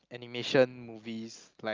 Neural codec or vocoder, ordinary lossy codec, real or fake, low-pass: none; Opus, 24 kbps; real; 7.2 kHz